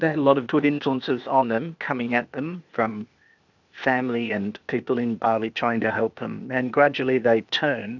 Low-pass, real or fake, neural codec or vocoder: 7.2 kHz; fake; codec, 16 kHz, 0.8 kbps, ZipCodec